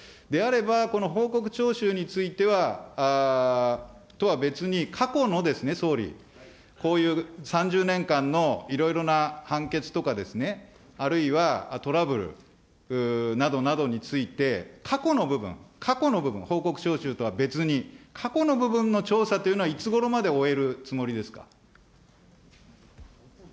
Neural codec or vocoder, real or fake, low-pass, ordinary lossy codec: none; real; none; none